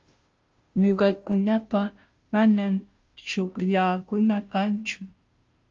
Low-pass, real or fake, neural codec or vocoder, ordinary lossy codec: 7.2 kHz; fake; codec, 16 kHz, 0.5 kbps, FunCodec, trained on Chinese and English, 25 frames a second; Opus, 32 kbps